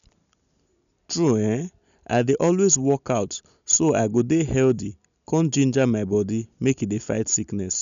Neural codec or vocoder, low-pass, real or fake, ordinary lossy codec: none; 7.2 kHz; real; none